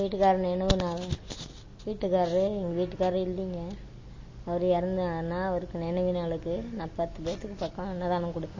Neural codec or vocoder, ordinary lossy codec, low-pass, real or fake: none; MP3, 32 kbps; 7.2 kHz; real